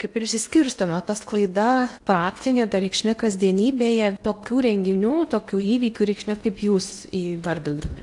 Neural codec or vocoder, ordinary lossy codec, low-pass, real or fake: codec, 16 kHz in and 24 kHz out, 0.8 kbps, FocalCodec, streaming, 65536 codes; AAC, 64 kbps; 10.8 kHz; fake